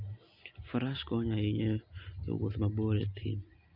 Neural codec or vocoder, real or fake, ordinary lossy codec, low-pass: none; real; Opus, 64 kbps; 5.4 kHz